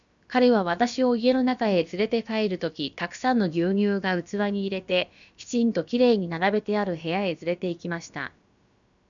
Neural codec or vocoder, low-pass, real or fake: codec, 16 kHz, about 1 kbps, DyCAST, with the encoder's durations; 7.2 kHz; fake